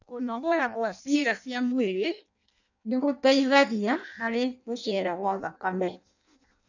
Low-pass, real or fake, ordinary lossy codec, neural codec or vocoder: 7.2 kHz; fake; none; codec, 16 kHz in and 24 kHz out, 0.6 kbps, FireRedTTS-2 codec